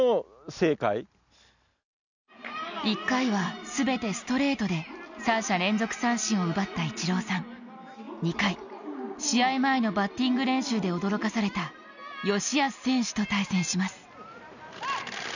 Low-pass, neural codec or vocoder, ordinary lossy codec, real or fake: 7.2 kHz; none; none; real